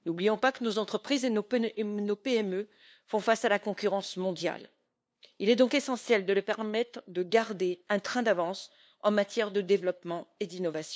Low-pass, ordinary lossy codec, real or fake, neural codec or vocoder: none; none; fake; codec, 16 kHz, 2 kbps, FunCodec, trained on LibriTTS, 25 frames a second